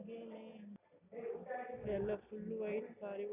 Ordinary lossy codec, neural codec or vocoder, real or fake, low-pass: none; none; real; 3.6 kHz